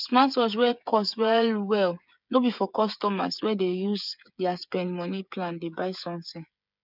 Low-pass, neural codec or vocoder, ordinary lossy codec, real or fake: 5.4 kHz; codec, 16 kHz, 8 kbps, FreqCodec, smaller model; none; fake